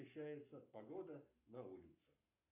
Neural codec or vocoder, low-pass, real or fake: codec, 44.1 kHz, 7.8 kbps, Pupu-Codec; 3.6 kHz; fake